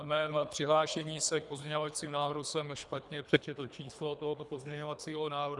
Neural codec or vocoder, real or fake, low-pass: codec, 24 kHz, 3 kbps, HILCodec; fake; 10.8 kHz